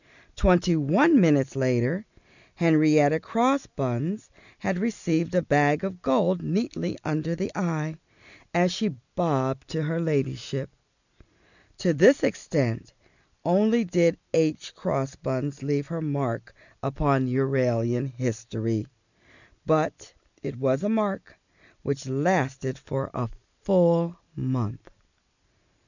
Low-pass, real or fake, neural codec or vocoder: 7.2 kHz; real; none